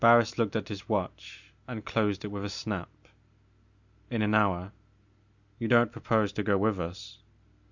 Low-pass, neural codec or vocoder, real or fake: 7.2 kHz; none; real